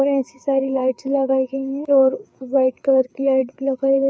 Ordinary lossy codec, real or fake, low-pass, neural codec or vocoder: none; fake; none; codec, 16 kHz, 4 kbps, FreqCodec, larger model